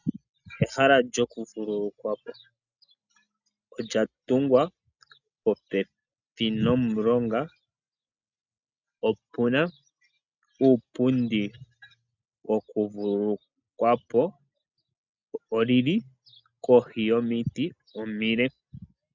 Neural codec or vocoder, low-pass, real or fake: none; 7.2 kHz; real